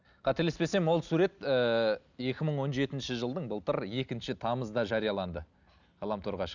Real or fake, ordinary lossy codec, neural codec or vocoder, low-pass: real; none; none; 7.2 kHz